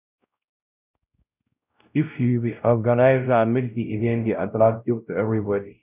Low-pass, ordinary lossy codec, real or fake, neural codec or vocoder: 3.6 kHz; none; fake; codec, 16 kHz, 0.5 kbps, X-Codec, WavLM features, trained on Multilingual LibriSpeech